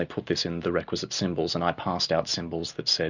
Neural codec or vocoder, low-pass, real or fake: none; 7.2 kHz; real